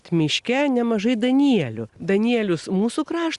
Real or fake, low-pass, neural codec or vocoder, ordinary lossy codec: real; 10.8 kHz; none; Opus, 64 kbps